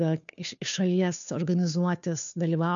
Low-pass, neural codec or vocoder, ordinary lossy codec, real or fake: 7.2 kHz; codec, 16 kHz, 2 kbps, FunCodec, trained on Chinese and English, 25 frames a second; MP3, 96 kbps; fake